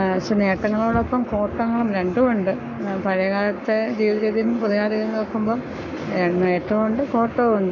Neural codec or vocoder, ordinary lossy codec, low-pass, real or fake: codec, 44.1 kHz, 7.8 kbps, Pupu-Codec; none; 7.2 kHz; fake